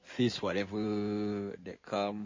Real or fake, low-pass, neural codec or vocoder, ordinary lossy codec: fake; 7.2 kHz; codec, 16 kHz in and 24 kHz out, 2.2 kbps, FireRedTTS-2 codec; MP3, 32 kbps